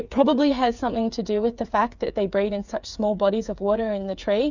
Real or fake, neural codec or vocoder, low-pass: fake; codec, 16 kHz, 8 kbps, FreqCodec, smaller model; 7.2 kHz